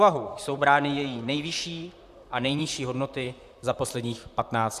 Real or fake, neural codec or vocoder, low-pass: fake; vocoder, 44.1 kHz, 128 mel bands, Pupu-Vocoder; 14.4 kHz